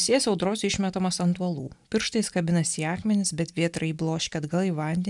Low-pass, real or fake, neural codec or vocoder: 10.8 kHz; real; none